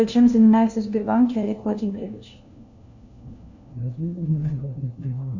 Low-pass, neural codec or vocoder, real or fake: 7.2 kHz; codec, 16 kHz, 1 kbps, FunCodec, trained on LibriTTS, 50 frames a second; fake